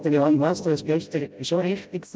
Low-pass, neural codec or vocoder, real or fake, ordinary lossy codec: none; codec, 16 kHz, 0.5 kbps, FreqCodec, smaller model; fake; none